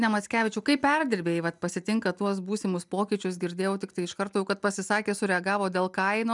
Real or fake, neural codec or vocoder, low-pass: real; none; 10.8 kHz